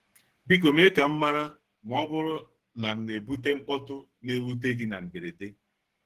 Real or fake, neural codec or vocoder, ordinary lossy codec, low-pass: fake; codec, 44.1 kHz, 2.6 kbps, SNAC; Opus, 16 kbps; 14.4 kHz